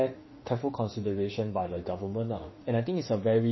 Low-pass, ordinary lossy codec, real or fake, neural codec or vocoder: 7.2 kHz; MP3, 24 kbps; fake; autoencoder, 48 kHz, 32 numbers a frame, DAC-VAE, trained on Japanese speech